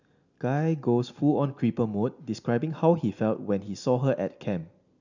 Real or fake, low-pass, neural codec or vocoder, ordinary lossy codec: real; 7.2 kHz; none; none